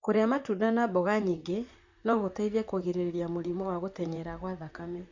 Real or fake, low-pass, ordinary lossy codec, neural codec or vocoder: fake; 7.2 kHz; Opus, 64 kbps; vocoder, 44.1 kHz, 128 mel bands, Pupu-Vocoder